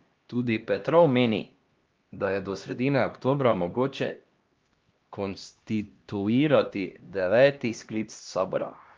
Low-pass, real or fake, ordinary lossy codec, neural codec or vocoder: 7.2 kHz; fake; Opus, 32 kbps; codec, 16 kHz, 1 kbps, X-Codec, HuBERT features, trained on LibriSpeech